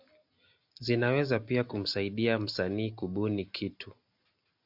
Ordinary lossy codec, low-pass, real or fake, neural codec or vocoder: AAC, 48 kbps; 5.4 kHz; real; none